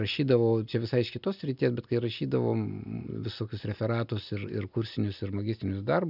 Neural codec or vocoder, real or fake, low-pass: none; real; 5.4 kHz